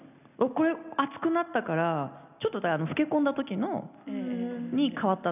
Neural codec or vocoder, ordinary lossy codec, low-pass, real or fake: none; none; 3.6 kHz; real